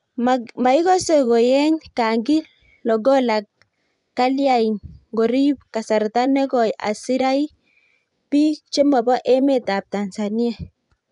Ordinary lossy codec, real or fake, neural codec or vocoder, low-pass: none; fake; vocoder, 24 kHz, 100 mel bands, Vocos; 10.8 kHz